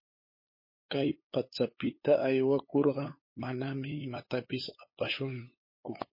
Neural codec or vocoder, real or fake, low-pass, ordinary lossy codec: codec, 16 kHz, 8 kbps, FunCodec, trained on LibriTTS, 25 frames a second; fake; 5.4 kHz; MP3, 24 kbps